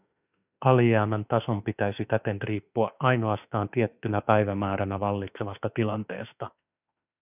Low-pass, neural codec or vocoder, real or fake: 3.6 kHz; autoencoder, 48 kHz, 32 numbers a frame, DAC-VAE, trained on Japanese speech; fake